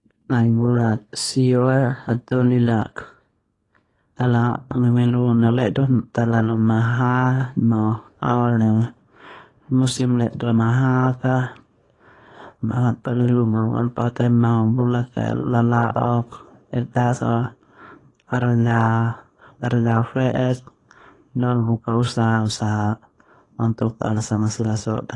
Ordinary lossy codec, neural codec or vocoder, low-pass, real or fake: AAC, 32 kbps; codec, 24 kHz, 0.9 kbps, WavTokenizer, small release; 10.8 kHz; fake